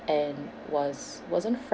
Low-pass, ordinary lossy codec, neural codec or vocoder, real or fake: none; none; none; real